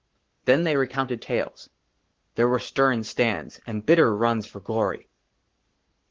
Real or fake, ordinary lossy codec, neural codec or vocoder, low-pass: fake; Opus, 16 kbps; codec, 44.1 kHz, 7.8 kbps, Pupu-Codec; 7.2 kHz